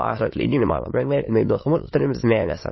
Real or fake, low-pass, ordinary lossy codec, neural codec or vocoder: fake; 7.2 kHz; MP3, 24 kbps; autoencoder, 22.05 kHz, a latent of 192 numbers a frame, VITS, trained on many speakers